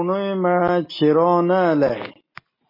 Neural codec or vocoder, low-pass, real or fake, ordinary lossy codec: none; 5.4 kHz; real; MP3, 24 kbps